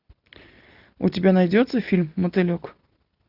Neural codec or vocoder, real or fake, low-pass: none; real; 5.4 kHz